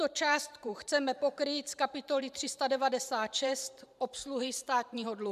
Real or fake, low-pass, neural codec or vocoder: fake; 14.4 kHz; vocoder, 44.1 kHz, 128 mel bands every 512 samples, BigVGAN v2